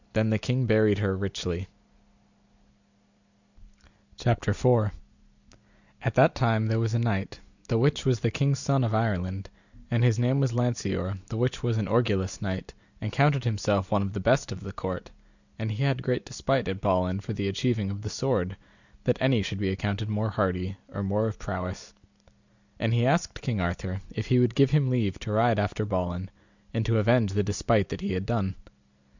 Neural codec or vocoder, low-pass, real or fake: none; 7.2 kHz; real